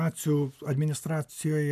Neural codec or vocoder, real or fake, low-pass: none; real; 14.4 kHz